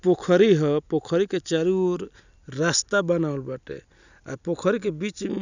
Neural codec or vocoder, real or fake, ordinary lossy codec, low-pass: none; real; none; 7.2 kHz